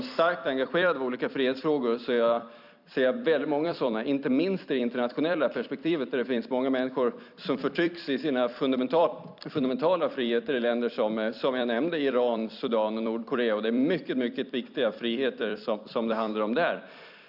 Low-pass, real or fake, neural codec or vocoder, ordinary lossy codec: 5.4 kHz; fake; vocoder, 44.1 kHz, 128 mel bands every 256 samples, BigVGAN v2; none